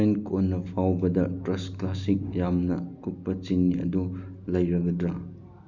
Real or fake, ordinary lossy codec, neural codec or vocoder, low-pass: real; none; none; 7.2 kHz